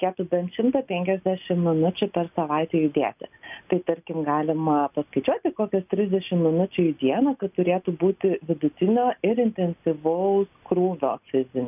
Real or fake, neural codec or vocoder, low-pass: real; none; 3.6 kHz